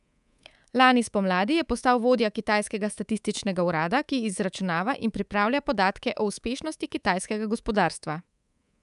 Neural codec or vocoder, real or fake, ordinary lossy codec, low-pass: codec, 24 kHz, 3.1 kbps, DualCodec; fake; none; 10.8 kHz